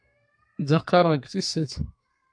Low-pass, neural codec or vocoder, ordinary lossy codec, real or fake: 9.9 kHz; codec, 32 kHz, 1.9 kbps, SNAC; AAC, 64 kbps; fake